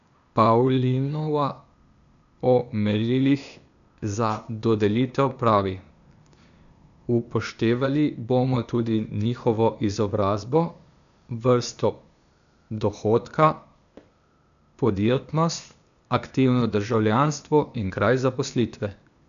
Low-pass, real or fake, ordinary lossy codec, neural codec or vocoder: 7.2 kHz; fake; none; codec, 16 kHz, 0.8 kbps, ZipCodec